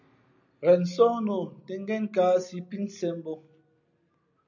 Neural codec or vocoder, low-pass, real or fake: none; 7.2 kHz; real